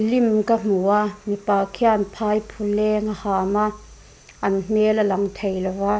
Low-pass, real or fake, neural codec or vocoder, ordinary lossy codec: none; real; none; none